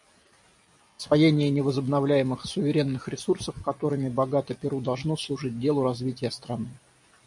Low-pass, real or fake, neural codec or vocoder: 10.8 kHz; real; none